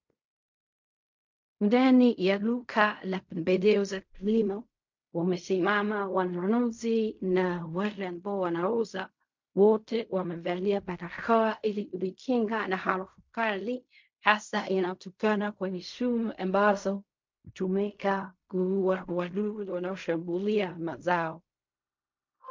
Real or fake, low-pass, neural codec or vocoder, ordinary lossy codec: fake; 7.2 kHz; codec, 16 kHz in and 24 kHz out, 0.4 kbps, LongCat-Audio-Codec, fine tuned four codebook decoder; MP3, 48 kbps